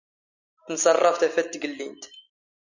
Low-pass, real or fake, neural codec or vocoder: 7.2 kHz; real; none